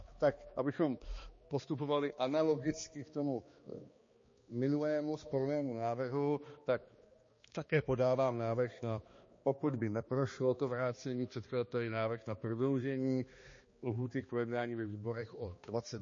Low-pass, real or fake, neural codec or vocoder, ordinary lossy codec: 7.2 kHz; fake; codec, 16 kHz, 2 kbps, X-Codec, HuBERT features, trained on balanced general audio; MP3, 32 kbps